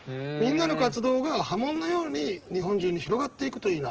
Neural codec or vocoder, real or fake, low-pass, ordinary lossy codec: none; real; 7.2 kHz; Opus, 16 kbps